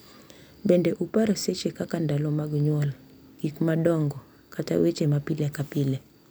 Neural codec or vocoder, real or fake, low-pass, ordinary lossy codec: none; real; none; none